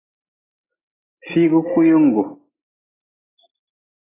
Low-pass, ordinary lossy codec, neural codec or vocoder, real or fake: 3.6 kHz; AAC, 16 kbps; none; real